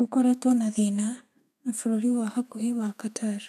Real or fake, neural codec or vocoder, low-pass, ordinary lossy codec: fake; codec, 32 kHz, 1.9 kbps, SNAC; 14.4 kHz; none